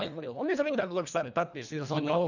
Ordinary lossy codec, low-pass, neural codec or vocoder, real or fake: none; 7.2 kHz; codec, 24 kHz, 1.5 kbps, HILCodec; fake